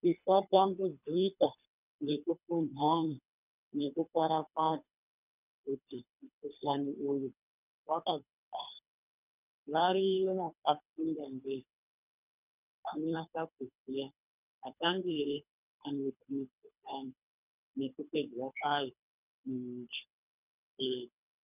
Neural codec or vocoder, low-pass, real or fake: codec, 24 kHz, 3 kbps, HILCodec; 3.6 kHz; fake